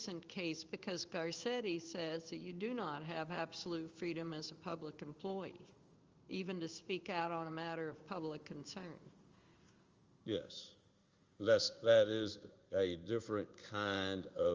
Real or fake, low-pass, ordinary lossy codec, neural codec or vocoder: fake; 7.2 kHz; Opus, 32 kbps; codec, 16 kHz in and 24 kHz out, 1 kbps, XY-Tokenizer